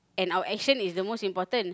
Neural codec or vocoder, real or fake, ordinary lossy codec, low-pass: none; real; none; none